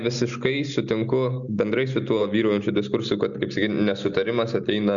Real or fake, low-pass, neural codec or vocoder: real; 7.2 kHz; none